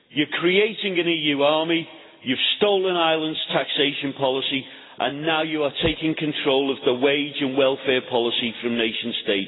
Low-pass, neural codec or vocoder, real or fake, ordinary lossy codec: 7.2 kHz; none; real; AAC, 16 kbps